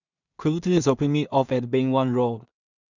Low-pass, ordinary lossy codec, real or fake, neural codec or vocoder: 7.2 kHz; none; fake; codec, 16 kHz in and 24 kHz out, 0.4 kbps, LongCat-Audio-Codec, two codebook decoder